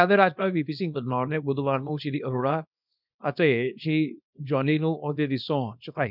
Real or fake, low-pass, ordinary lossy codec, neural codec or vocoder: fake; 5.4 kHz; none; codec, 24 kHz, 0.9 kbps, WavTokenizer, small release